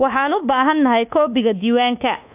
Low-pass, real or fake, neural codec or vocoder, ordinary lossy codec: 3.6 kHz; fake; codec, 24 kHz, 1.2 kbps, DualCodec; AAC, 32 kbps